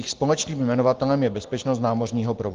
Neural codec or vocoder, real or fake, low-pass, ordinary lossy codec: none; real; 7.2 kHz; Opus, 16 kbps